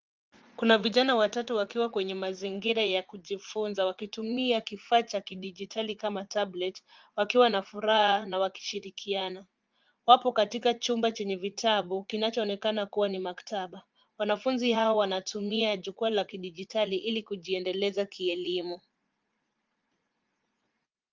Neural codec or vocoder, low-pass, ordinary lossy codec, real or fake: vocoder, 44.1 kHz, 80 mel bands, Vocos; 7.2 kHz; Opus, 32 kbps; fake